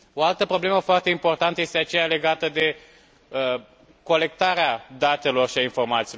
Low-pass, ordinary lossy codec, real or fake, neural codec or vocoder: none; none; real; none